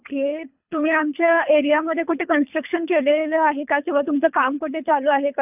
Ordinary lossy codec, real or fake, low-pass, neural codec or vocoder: none; fake; 3.6 kHz; codec, 24 kHz, 3 kbps, HILCodec